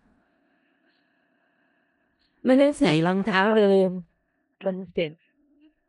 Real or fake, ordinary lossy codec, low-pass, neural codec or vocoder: fake; none; 10.8 kHz; codec, 16 kHz in and 24 kHz out, 0.4 kbps, LongCat-Audio-Codec, four codebook decoder